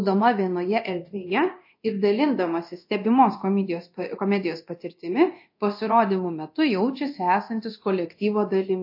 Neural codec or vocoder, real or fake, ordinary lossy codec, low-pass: codec, 24 kHz, 0.9 kbps, DualCodec; fake; MP3, 32 kbps; 5.4 kHz